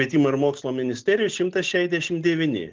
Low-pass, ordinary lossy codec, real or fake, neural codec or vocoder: 7.2 kHz; Opus, 16 kbps; real; none